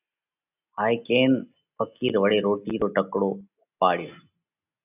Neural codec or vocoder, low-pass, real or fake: none; 3.6 kHz; real